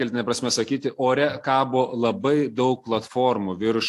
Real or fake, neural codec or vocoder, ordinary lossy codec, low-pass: real; none; AAC, 64 kbps; 14.4 kHz